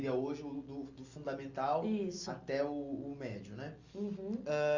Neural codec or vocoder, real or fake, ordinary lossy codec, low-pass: none; real; Opus, 64 kbps; 7.2 kHz